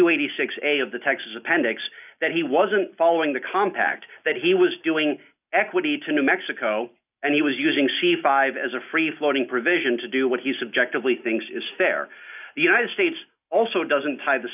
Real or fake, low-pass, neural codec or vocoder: real; 3.6 kHz; none